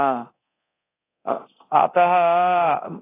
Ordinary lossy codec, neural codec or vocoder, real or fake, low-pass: AAC, 16 kbps; codec, 24 kHz, 0.9 kbps, DualCodec; fake; 3.6 kHz